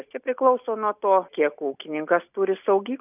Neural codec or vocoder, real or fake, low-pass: none; real; 3.6 kHz